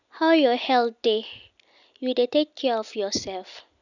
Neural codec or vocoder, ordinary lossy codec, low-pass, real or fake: none; none; 7.2 kHz; real